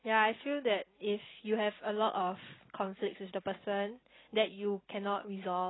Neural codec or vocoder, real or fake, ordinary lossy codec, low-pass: none; real; AAC, 16 kbps; 7.2 kHz